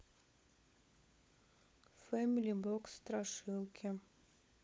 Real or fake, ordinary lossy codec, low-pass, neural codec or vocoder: fake; none; none; codec, 16 kHz, 16 kbps, FunCodec, trained on LibriTTS, 50 frames a second